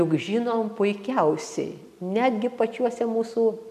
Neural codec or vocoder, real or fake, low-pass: none; real; 14.4 kHz